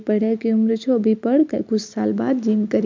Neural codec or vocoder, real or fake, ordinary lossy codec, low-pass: none; real; MP3, 64 kbps; 7.2 kHz